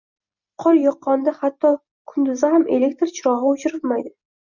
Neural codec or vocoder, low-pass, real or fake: none; 7.2 kHz; real